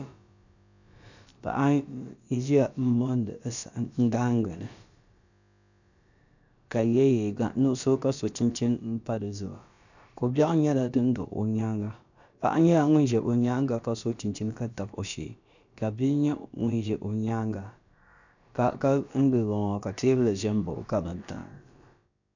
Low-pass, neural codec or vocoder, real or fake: 7.2 kHz; codec, 16 kHz, about 1 kbps, DyCAST, with the encoder's durations; fake